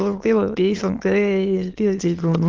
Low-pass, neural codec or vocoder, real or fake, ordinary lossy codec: 7.2 kHz; autoencoder, 22.05 kHz, a latent of 192 numbers a frame, VITS, trained on many speakers; fake; Opus, 16 kbps